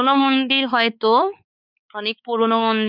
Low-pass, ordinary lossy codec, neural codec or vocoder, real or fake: 5.4 kHz; none; codec, 16 kHz, 4 kbps, X-Codec, WavLM features, trained on Multilingual LibriSpeech; fake